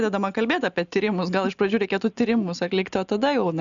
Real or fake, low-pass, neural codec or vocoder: real; 7.2 kHz; none